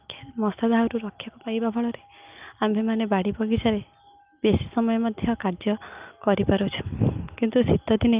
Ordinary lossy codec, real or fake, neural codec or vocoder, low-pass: Opus, 24 kbps; real; none; 3.6 kHz